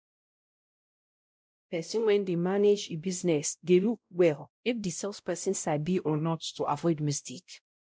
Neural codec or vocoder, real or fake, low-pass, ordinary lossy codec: codec, 16 kHz, 0.5 kbps, X-Codec, WavLM features, trained on Multilingual LibriSpeech; fake; none; none